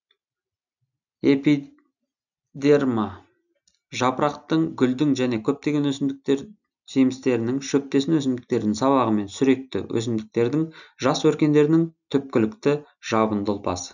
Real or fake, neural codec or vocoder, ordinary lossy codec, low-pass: real; none; none; 7.2 kHz